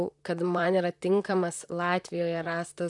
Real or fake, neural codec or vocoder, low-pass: fake; vocoder, 44.1 kHz, 128 mel bands, Pupu-Vocoder; 10.8 kHz